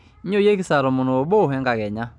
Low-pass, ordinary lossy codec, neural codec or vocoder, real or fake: none; none; none; real